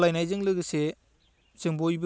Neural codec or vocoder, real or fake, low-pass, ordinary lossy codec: none; real; none; none